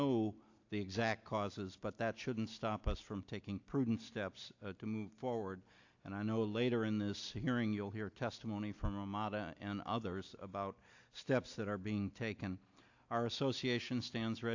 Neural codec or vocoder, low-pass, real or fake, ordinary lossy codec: none; 7.2 kHz; real; AAC, 48 kbps